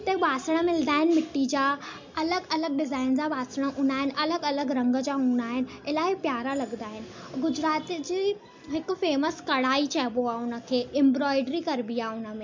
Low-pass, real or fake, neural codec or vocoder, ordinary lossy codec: 7.2 kHz; real; none; MP3, 64 kbps